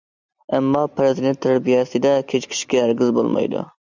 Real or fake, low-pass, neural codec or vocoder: real; 7.2 kHz; none